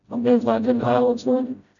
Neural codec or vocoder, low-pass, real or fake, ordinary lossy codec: codec, 16 kHz, 0.5 kbps, FreqCodec, smaller model; 7.2 kHz; fake; MP3, 96 kbps